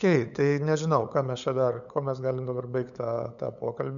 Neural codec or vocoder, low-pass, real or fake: codec, 16 kHz, 8 kbps, FunCodec, trained on LibriTTS, 25 frames a second; 7.2 kHz; fake